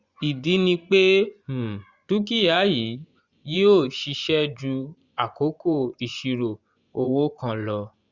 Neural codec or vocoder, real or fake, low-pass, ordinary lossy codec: vocoder, 24 kHz, 100 mel bands, Vocos; fake; 7.2 kHz; Opus, 64 kbps